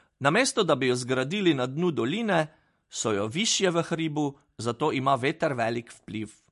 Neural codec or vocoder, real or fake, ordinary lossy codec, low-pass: none; real; MP3, 48 kbps; 14.4 kHz